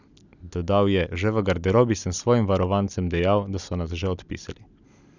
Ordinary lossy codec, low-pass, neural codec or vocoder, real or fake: none; 7.2 kHz; none; real